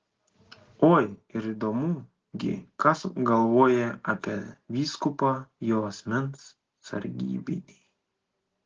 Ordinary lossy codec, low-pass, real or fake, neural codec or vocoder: Opus, 16 kbps; 7.2 kHz; real; none